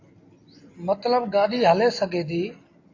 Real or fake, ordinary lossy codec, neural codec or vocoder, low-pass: real; AAC, 32 kbps; none; 7.2 kHz